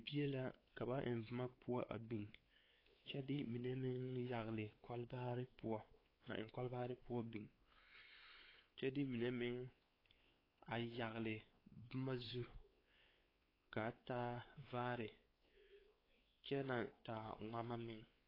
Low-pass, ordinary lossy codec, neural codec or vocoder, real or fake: 5.4 kHz; AAC, 24 kbps; codec, 16 kHz, 4 kbps, X-Codec, WavLM features, trained on Multilingual LibriSpeech; fake